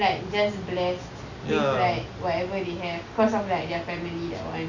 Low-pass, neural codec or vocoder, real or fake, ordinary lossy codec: 7.2 kHz; none; real; none